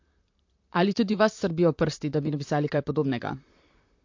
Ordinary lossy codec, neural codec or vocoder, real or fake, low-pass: MP3, 48 kbps; vocoder, 44.1 kHz, 128 mel bands, Pupu-Vocoder; fake; 7.2 kHz